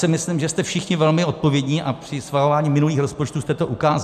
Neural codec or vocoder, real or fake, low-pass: none; real; 14.4 kHz